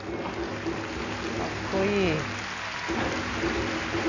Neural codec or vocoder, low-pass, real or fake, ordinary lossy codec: none; 7.2 kHz; real; none